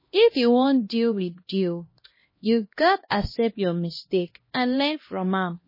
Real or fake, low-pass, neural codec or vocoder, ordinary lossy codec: fake; 5.4 kHz; codec, 16 kHz, 1 kbps, X-Codec, HuBERT features, trained on LibriSpeech; MP3, 24 kbps